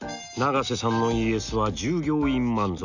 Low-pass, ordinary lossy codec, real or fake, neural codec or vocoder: 7.2 kHz; none; real; none